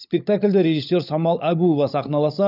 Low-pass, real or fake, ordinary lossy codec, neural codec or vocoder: 5.4 kHz; fake; none; codec, 16 kHz, 16 kbps, FunCodec, trained on LibriTTS, 50 frames a second